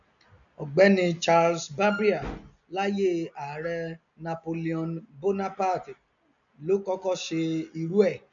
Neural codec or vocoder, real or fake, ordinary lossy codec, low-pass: none; real; none; 7.2 kHz